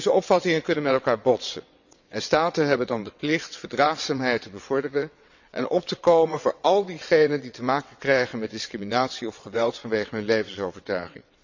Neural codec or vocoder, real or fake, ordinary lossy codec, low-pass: vocoder, 22.05 kHz, 80 mel bands, WaveNeXt; fake; none; 7.2 kHz